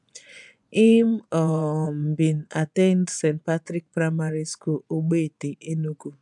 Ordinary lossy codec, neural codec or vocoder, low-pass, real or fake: none; vocoder, 24 kHz, 100 mel bands, Vocos; 10.8 kHz; fake